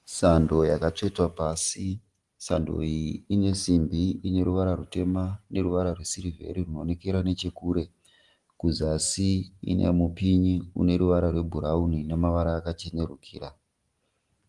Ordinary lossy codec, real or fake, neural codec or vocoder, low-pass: Opus, 24 kbps; real; none; 10.8 kHz